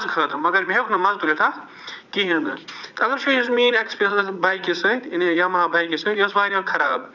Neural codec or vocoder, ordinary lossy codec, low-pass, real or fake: vocoder, 44.1 kHz, 80 mel bands, Vocos; none; 7.2 kHz; fake